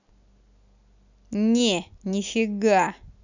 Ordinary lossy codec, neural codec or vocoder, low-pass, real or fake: none; none; 7.2 kHz; real